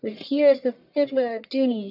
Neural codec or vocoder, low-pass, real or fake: codec, 44.1 kHz, 1.7 kbps, Pupu-Codec; 5.4 kHz; fake